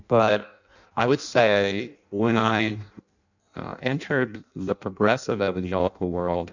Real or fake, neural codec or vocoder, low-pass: fake; codec, 16 kHz in and 24 kHz out, 0.6 kbps, FireRedTTS-2 codec; 7.2 kHz